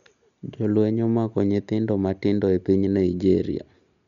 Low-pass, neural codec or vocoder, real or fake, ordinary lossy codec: 7.2 kHz; none; real; none